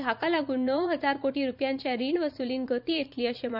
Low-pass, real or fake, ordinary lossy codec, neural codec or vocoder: 5.4 kHz; fake; none; vocoder, 44.1 kHz, 80 mel bands, Vocos